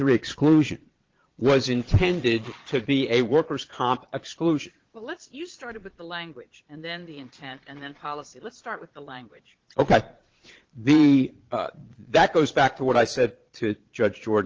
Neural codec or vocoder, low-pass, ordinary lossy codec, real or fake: vocoder, 22.05 kHz, 80 mel bands, Vocos; 7.2 kHz; Opus, 16 kbps; fake